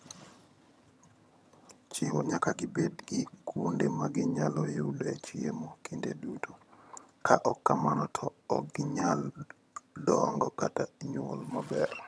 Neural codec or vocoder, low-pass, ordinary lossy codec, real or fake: vocoder, 22.05 kHz, 80 mel bands, HiFi-GAN; none; none; fake